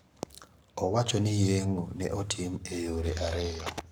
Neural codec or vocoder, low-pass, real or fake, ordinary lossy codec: codec, 44.1 kHz, 7.8 kbps, Pupu-Codec; none; fake; none